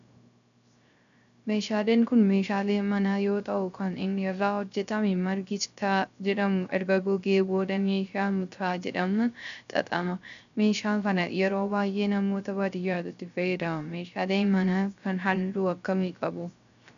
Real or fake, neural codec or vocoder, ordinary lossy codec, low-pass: fake; codec, 16 kHz, 0.3 kbps, FocalCodec; MP3, 96 kbps; 7.2 kHz